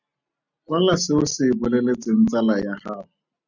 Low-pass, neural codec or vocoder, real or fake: 7.2 kHz; none; real